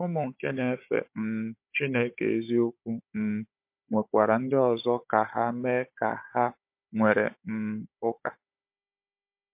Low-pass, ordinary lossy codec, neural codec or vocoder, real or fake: 3.6 kHz; MP3, 32 kbps; codec, 16 kHz, 16 kbps, FunCodec, trained on Chinese and English, 50 frames a second; fake